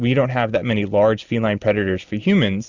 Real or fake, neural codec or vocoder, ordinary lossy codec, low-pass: real; none; Opus, 64 kbps; 7.2 kHz